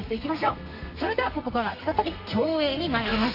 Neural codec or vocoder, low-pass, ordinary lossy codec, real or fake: codec, 32 kHz, 1.9 kbps, SNAC; 5.4 kHz; none; fake